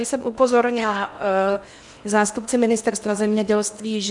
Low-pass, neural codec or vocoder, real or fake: 10.8 kHz; codec, 16 kHz in and 24 kHz out, 0.8 kbps, FocalCodec, streaming, 65536 codes; fake